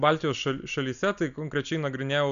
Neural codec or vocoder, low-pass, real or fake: none; 7.2 kHz; real